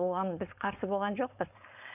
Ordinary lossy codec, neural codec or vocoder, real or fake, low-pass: none; codec, 16 kHz, 4.8 kbps, FACodec; fake; 3.6 kHz